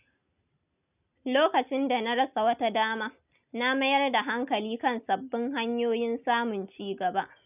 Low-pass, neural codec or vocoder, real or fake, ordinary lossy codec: 3.6 kHz; none; real; none